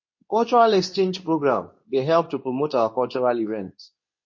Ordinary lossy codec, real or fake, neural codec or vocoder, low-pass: MP3, 32 kbps; fake; codec, 24 kHz, 0.9 kbps, WavTokenizer, medium speech release version 2; 7.2 kHz